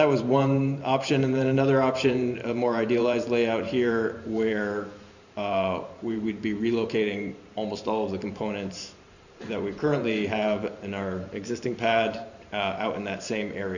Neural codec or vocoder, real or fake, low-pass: vocoder, 44.1 kHz, 128 mel bands every 512 samples, BigVGAN v2; fake; 7.2 kHz